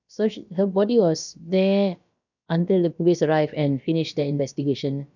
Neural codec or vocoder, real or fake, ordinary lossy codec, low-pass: codec, 16 kHz, about 1 kbps, DyCAST, with the encoder's durations; fake; none; 7.2 kHz